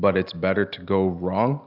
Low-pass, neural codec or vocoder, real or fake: 5.4 kHz; none; real